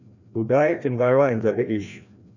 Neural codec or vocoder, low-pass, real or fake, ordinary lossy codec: codec, 16 kHz, 1 kbps, FreqCodec, larger model; 7.2 kHz; fake; none